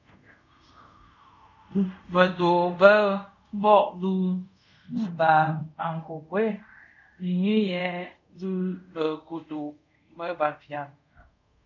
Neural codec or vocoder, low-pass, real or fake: codec, 24 kHz, 0.5 kbps, DualCodec; 7.2 kHz; fake